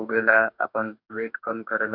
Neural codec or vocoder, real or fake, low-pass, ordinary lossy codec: codec, 44.1 kHz, 2.6 kbps, DAC; fake; 5.4 kHz; none